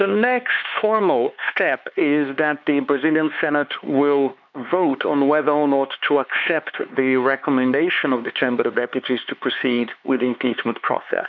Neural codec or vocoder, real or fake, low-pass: codec, 16 kHz, 4 kbps, X-Codec, HuBERT features, trained on LibriSpeech; fake; 7.2 kHz